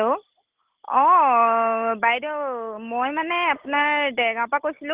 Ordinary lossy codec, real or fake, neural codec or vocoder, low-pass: Opus, 16 kbps; real; none; 3.6 kHz